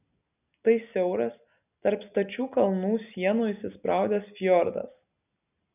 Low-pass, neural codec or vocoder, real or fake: 3.6 kHz; none; real